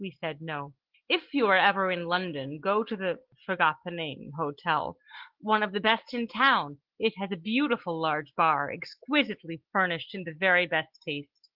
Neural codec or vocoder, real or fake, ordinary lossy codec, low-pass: none; real; Opus, 16 kbps; 5.4 kHz